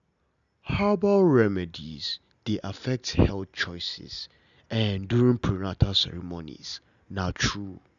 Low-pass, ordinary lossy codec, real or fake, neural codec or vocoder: 7.2 kHz; none; real; none